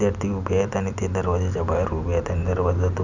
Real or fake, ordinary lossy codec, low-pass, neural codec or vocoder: real; none; 7.2 kHz; none